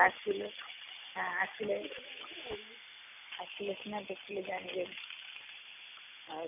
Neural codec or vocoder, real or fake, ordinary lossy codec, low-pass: none; real; none; 3.6 kHz